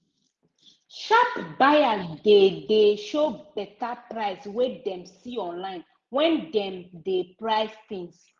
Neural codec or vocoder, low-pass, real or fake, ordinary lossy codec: none; 7.2 kHz; real; Opus, 32 kbps